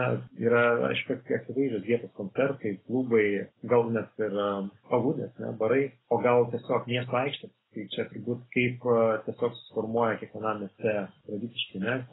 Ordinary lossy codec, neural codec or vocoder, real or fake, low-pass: AAC, 16 kbps; none; real; 7.2 kHz